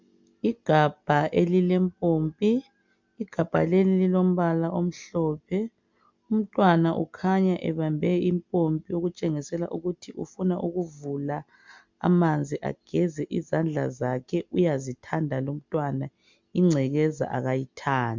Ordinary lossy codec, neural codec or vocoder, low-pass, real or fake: MP3, 64 kbps; none; 7.2 kHz; real